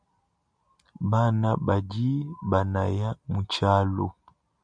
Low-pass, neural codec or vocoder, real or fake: 9.9 kHz; none; real